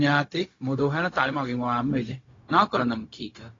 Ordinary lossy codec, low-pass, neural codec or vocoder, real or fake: AAC, 32 kbps; 7.2 kHz; codec, 16 kHz, 0.4 kbps, LongCat-Audio-Codec; fake